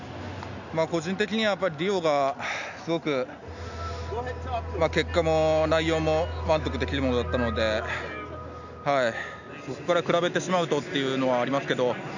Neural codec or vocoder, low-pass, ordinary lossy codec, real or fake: none; 7.2 kHz; none; real